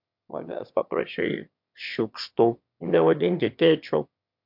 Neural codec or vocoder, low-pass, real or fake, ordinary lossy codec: autoencoder, 22.05 kHz, a latent of 192 numbers a frame, VITS, trained on one speaker; 5.4 kHz; fake; MP3, 48 kbps